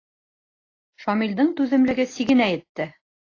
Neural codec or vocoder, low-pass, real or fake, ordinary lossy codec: none; 7.2 kHz; real; AAC, 32 kbps